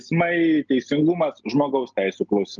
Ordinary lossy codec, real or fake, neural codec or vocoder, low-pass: Opus, 24 kbps; real; none; 7.2 kHz